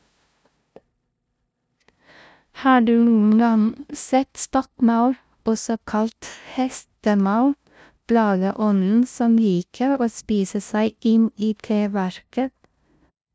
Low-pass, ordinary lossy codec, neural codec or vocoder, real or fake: none; none; codec, 16 kHz, 0.5 kbps, FunCodec, trained on LibriTTS, 25 frames a second; fake